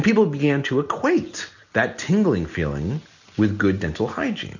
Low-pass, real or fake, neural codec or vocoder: 7.2 kHz; real; none